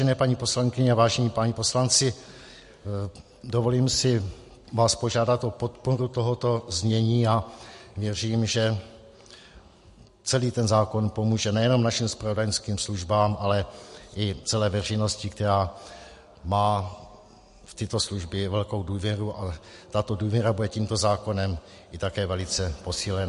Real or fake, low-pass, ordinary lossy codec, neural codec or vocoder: real; 14.4 kHz; MP3, 48 kbps; none